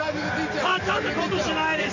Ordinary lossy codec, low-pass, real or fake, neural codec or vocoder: none; 7.2 kHz; real; none